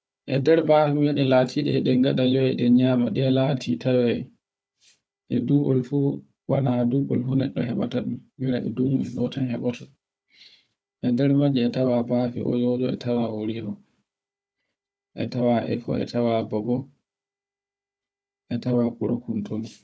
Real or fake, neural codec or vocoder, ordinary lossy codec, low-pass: fake; codec, 16 kHz, 4 kbps, FunCodec, trained on Chinese and English, 50 frames a second; none; none